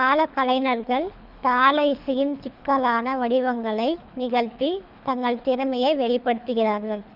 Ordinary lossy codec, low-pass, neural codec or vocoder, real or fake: none; 5.4 kHz; codec, 24 kHz, 3 kbps, HILCodec; fake